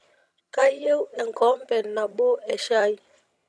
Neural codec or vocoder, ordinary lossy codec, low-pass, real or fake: vocoder, 22.05 kHz, 80 mel bands, HiFi-GAN; none; none; fake